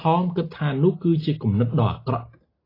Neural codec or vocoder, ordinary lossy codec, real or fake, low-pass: none; AAC, 24 kbps; real; 5.4 kHz